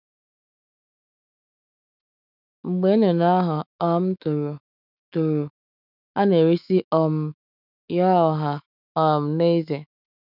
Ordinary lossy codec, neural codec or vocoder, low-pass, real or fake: none; autoencoder, 48 kHz, 128 numbers a frame, DAC-VAE, trained on Japanese speech; 5.4 kHz; fake